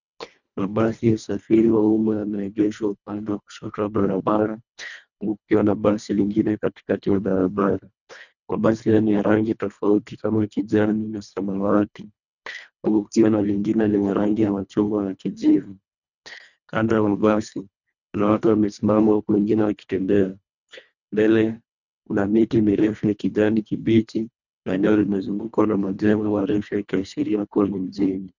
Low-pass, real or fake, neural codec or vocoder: 7.2 kHz; fake; codec, 24 kHz, 1.5 kbps, HILCodec